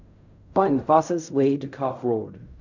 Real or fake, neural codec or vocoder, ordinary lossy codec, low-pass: fake; codec, 16 kHz in and 24 kHz out, 0.4 kbps, LongCat-Audio-Codec, fine tuned four codebook decoder; none; 7.2 kHz